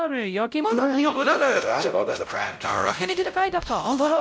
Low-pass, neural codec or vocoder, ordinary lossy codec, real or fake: none; codec, 16 kHz, 0.5 kbps, X-Codec, WavLM features, trained on Multilingual LibriSpeech; none; fake